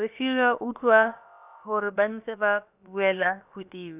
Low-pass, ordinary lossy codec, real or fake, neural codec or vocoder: 3.6 kHz; none; fake; codec, 16 kHz, about 1 kbps, DyCAST, with the encoder's durations